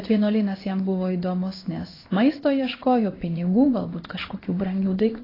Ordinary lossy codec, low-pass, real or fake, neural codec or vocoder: AAC, 24 kbps; 5.4 kHz; fake; codec, 16 kHz in and 24 kHz out, 1 kbps, XY-Tokenizer